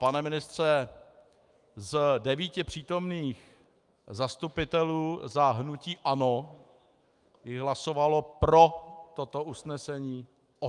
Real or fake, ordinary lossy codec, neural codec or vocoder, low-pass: fake; Opus, 32 kbps; autoencoder, 48 kHz, 128 numbers a frame, DAC-VAE, trained on Japanese speech; 10.8 kHz